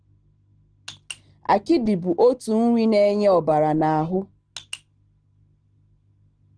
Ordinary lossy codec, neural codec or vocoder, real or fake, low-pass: Opus, 16 kbps; none; real; 9.9 kHz